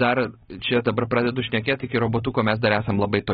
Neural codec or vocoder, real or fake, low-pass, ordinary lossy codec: none; real; 7.2 kHz; AAC, 16 kbps